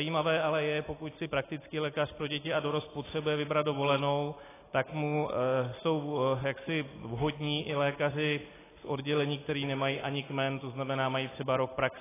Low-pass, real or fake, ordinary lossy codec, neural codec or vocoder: 3.6 kHz; real; AAC, 16 kbps; none